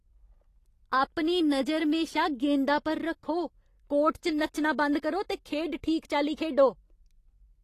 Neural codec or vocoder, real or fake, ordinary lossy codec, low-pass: none; real; AAC, 48 kbps; 14.4 kHz